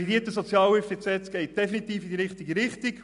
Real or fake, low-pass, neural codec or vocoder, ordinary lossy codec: real; 10.8 kHz; none; MP3, 96 kbps